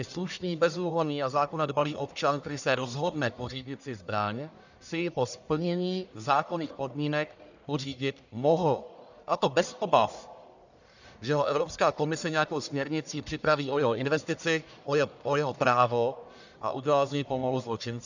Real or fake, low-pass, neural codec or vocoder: fake; 7.2 kHz; codec, 44.1 kHz, 1.7 kbps, Pupu-Codec